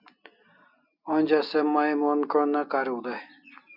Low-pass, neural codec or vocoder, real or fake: 5.4 kHz; none; real